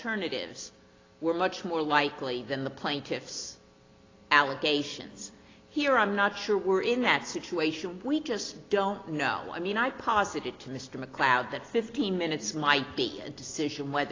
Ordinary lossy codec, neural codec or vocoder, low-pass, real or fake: AAC, 32 kbps; none; 7.2 kHz; real